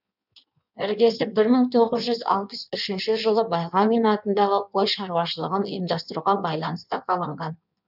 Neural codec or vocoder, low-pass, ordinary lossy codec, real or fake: codec, 16 kHz in and 24 kHz out, 1.1 kbps, FireRedTTS-2 codec; 5.4 kHz; none; fake